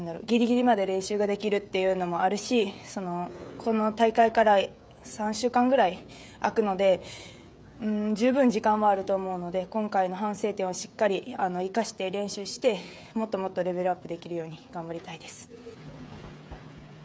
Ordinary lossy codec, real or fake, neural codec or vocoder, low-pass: none; fake; codec, 16 kHz, 16 kbps, FreqCodec, smaller model; none